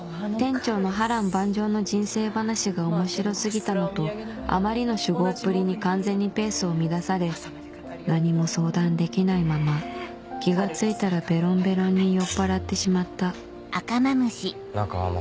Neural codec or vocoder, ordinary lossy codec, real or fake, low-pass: none; none; real; none